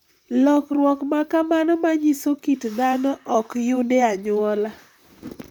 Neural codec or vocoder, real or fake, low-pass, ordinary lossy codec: vocoder, 44.1 kHz, 128 mel bands, Pupu-Vocoder; fake; 19.8 kHz; none